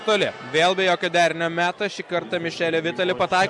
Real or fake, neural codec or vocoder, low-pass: real; none; 10.8 kHz